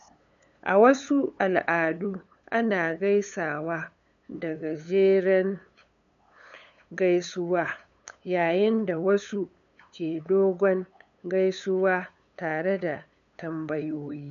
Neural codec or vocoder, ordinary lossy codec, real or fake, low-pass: codec, 16 kHz, 8 kbps, FunCodec, trained on LibriTTS, 25 frames a second; none; fake; 7.2 kHz